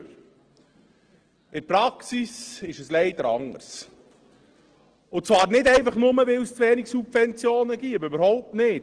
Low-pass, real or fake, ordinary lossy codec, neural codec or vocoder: 9.9 kHz; real; Opus, 16 kbps; none